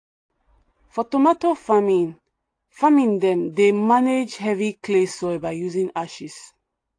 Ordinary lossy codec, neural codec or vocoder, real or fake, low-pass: AAC, 48 kbps; none; real; 9.9 kHz